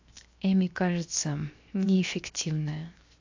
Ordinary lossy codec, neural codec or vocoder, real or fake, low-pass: AAC, 48 kbps; codec, 16 kHz, 0.7 kbps, FocalCodec; fake; 7.2 kHz